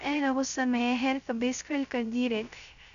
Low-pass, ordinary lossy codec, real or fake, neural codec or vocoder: 7.2 kHz; none; fake; codec, 16 kHz, 0.3 kbps, FocalCodec